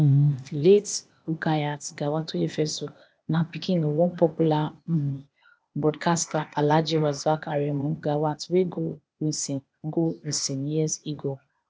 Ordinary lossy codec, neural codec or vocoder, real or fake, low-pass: none; codec, 16 kHz, 0.8 kbps, ZipCodec; fake; none